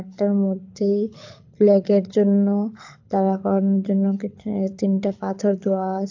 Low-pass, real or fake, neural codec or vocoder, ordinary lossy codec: 7.2 kHz; fake; codec, 24 kHz, 6 kbps, HILCodec; AAC, 48 kbps